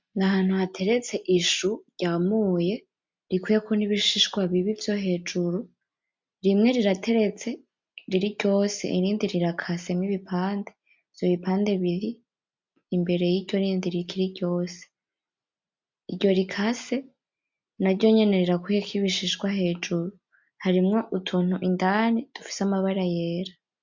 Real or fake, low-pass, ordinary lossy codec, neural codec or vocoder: real; 7.2 kHz; MP3, 64 kbps; none